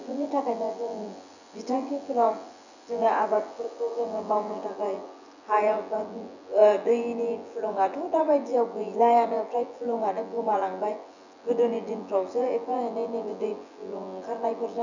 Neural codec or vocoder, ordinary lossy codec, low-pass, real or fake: vocoder, 24 kHz, 100 mel bands, Vocos; none; 7.2 kHz; fake